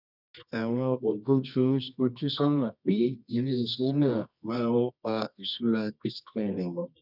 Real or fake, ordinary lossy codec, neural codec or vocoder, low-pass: fake; none; codec, 24 kHz, 0.9 kbps, WavTokenizer, medium music audio release; 5.4 kHz